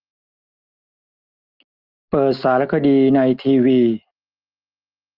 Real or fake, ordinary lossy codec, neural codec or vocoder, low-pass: real; Opus, 32 kbps; none; 5.4 kHz